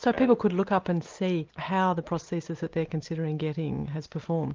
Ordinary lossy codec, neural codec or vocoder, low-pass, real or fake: Opus, 24 kbps; none; 7.2 kHz; real